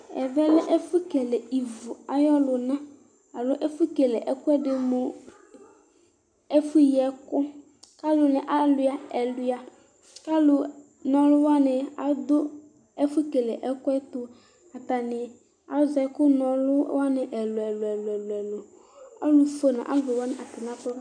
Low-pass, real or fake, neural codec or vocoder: 9.9 kHz; real; none